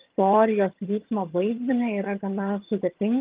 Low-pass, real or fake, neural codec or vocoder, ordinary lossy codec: 3.6 kHz; fake; vocoder, 22.05 kHz, 80 mel bands, HiFi-GAN; Opus, 32 kbps